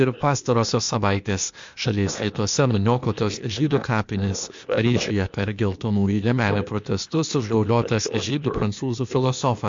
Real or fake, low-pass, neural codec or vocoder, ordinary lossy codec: fake; 7.2 kHz; codec, 16 kHz, 0.8 kbps, ZipCodec; MP3, 48 kbps